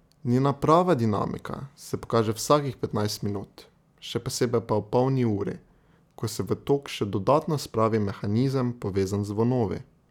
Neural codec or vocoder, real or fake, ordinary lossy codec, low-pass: none; real; none; 19.8 kHz